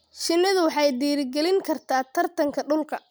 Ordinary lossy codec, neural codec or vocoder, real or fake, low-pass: none; none; real; none